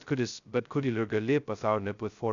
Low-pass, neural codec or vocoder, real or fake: 7.2 kHz; codec, 16 kHz, 0.2 kbps, FocalCodec; fake